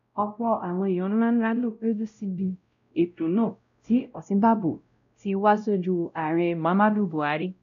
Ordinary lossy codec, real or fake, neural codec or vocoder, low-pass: AAC, 64 kbps; fake; codec, 16 kHz, 0.5 kbps, X-Codec, WavLM features, trained on Multilingual LibriSpeech; 7.2 kHz